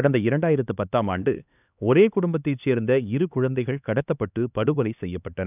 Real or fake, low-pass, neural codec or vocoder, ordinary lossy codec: fake; 3.6 kHz; codec, 16 kHz, 2 kbps, X-Codec, HuBERT features, trained on LibriSpeech; none